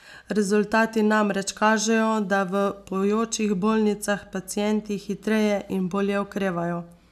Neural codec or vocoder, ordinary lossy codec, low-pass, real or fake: none; none; 14.4 kHz; real